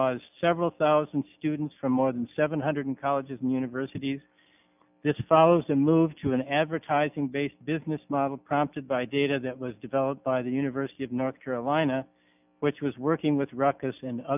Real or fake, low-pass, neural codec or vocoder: real; 3.6 kHz; none